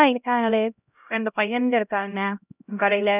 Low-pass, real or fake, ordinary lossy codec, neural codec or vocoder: 3.6 kHz; fake; none; codec, 16 kHz, 0.5 kbps, X-Codec, HuBERT features, trained on LibriSpeech